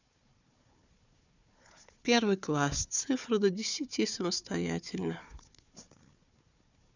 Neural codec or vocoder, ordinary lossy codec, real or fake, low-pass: codec, 16 kHz, 4 kbps, FunCodec, trained on Chinese and English, 50 frames a second; none; fake; 7.2 kHz